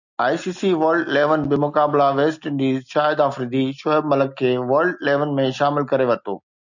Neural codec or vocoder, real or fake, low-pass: none; real; 7.2 kHz